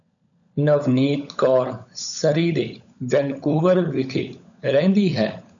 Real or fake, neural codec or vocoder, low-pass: fake; codec, 16 kHz, 16 kbps, FunCodec, trained on LibriTTS, 50 frames a second; 7.2 kHz